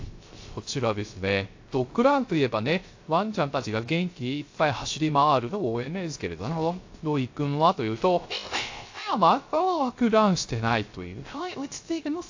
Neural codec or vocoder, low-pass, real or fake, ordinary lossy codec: codec, 16 kHz, 0.3 kbps, FocalCodec; 7.2 kHz; fake; AAC, 48 kbps